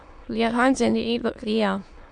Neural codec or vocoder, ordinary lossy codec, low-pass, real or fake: autoencoder, 22.05 kHz, a latent of 192 numbers a frame, VITS, trained on many speakers; AAC, 64 kbps; 9.9 kHz; fake